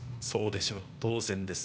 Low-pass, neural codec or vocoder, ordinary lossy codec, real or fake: none; codec, 16 kHz, 0.8 kbps, ZipCodec; none; fake